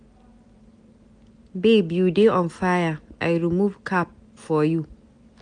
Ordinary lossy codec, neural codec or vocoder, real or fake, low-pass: AAC, 64 kbps; none; real; 9.9 kHz